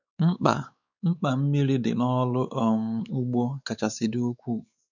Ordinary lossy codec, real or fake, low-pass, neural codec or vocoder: none; fake; 7.2 kHz; codec, 16 kHz, 4 kbps, X-Codec, WavLM features, trained on Multilingual LibriSpeech